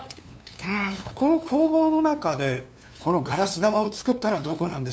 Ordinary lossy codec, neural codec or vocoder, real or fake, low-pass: none; codec, 16 kHz, 2 kbps, FunCodec, trained on LibriTTS, 25 frames a second; fake; none